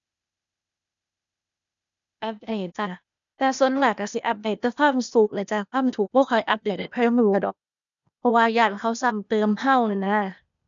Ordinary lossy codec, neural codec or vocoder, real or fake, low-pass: none; codec, 16 kHz, 0.8 kbps, ZipCodec; fake; 7.2 kHz